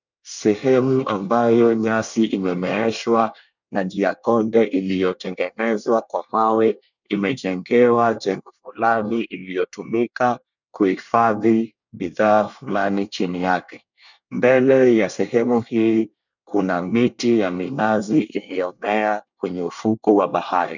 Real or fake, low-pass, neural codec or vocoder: fake; 7.2 kHz; codec, 24 kHz, 1 kbps, SNAC